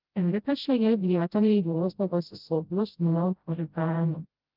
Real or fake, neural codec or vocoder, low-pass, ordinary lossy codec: fake; codec, 16 kHz, 0.5 kbps, FreqCodec, smaller model; 5.4 kHz; Opus, 24 kbps